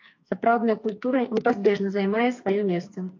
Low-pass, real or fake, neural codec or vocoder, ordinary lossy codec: 7.2 kHz; fake; codec, 44.1 kHz, 2.6 kbps, SNAC; AAC, 48 kbps